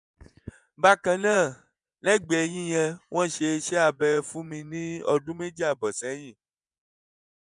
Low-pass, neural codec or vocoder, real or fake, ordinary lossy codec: 10.8 kHz; vocoder, 24 kHz, 100 mel bands, Vocos; fake; none